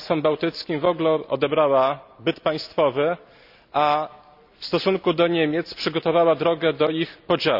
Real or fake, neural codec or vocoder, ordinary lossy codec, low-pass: real; none; none; 5.4 kHz